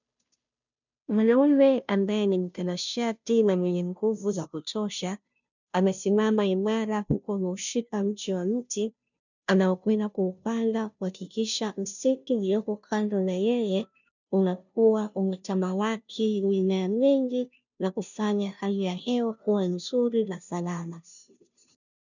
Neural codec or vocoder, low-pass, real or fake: codec, 16 kHz, 0.5 kbps, FunCodec, trained on Chinese and English, 25 frames a second; 7.2 kHz; fake